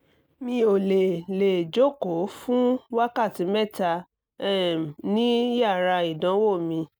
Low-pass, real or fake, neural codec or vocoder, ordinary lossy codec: none; real; none; none